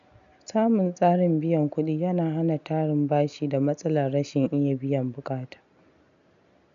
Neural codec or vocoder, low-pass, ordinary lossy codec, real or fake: none; 7.2 kHz; none; real